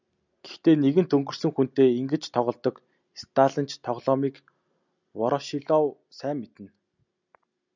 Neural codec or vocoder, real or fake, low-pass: none; real; 7.2 kHz